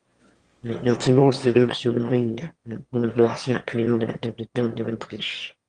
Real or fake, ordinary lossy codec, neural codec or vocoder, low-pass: fake; Opus, 32 kbps; autoencoder, 22.05 kHz, a latent of 192 numbers a frame, VITS, trained on one speaker; 9.9 kHz